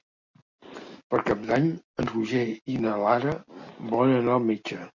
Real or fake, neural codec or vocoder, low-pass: real; none; 7.2 kHz